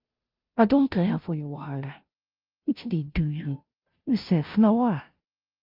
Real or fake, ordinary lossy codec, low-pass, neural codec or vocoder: fake; Opus, 24 kbps; 5.4 kHz; codec, 16 kHz, 0.5 kbps, FunCodec, trained on Chinese and English, 25 frames a second